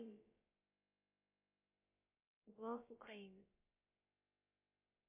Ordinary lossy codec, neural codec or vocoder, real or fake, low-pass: MP3, 24 kbps; codec, 16 kHz, about 1 kbps, DyCAST, with the encoder's durations; fake; 3.6 kHz